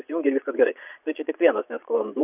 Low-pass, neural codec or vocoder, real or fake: 3.6 kHz; vocoder, 22.05 kHz, 80 mel bands, Vocos; fake